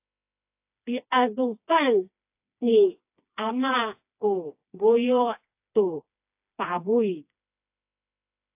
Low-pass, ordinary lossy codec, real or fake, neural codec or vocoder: 3.6 kHz; AAC, 32 kbps; fake; codec, 16 kHz, 2 kbps, FreqCodec, smaller model